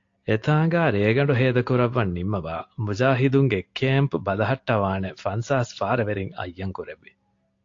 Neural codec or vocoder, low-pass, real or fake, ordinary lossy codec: none; 7.2 kHz; real; AAC, 48 kbps